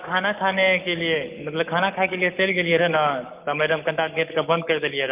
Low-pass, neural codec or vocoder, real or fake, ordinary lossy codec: 3.6 kHz; codec, 44.1 kHz, 7.8 kbps, Pupu-Codec; fake; Opus, 24 kbps